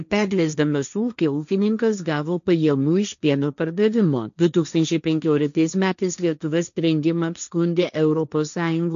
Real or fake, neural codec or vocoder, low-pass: fake; codec, 16 kHz, 1.1 kbps, Voila-Tokenizer; 7.2 kHz